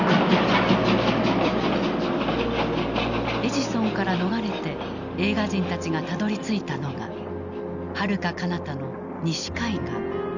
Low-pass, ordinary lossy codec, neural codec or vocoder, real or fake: 7.2 kHz; none; none; real